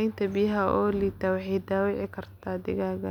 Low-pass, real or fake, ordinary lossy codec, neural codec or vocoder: 19.8 kHz; real; none; none